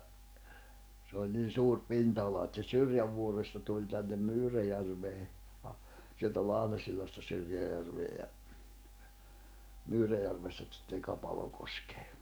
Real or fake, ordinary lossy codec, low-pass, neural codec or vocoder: fake; none; none; codec, 44.1 kHz, 7.8 kbps, DAC